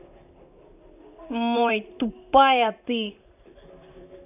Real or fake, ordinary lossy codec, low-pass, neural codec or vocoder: fake; none; 3.6 kHz; vocoder, 44.1 kHz, 128 mel bands, Pupu-Vocoder